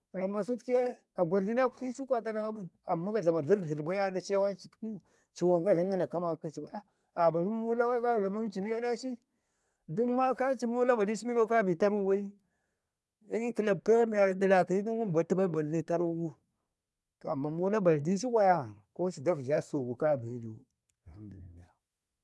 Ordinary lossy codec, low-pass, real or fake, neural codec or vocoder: none; none; fake; codec, 24 kHz, 1 kbps, SNAC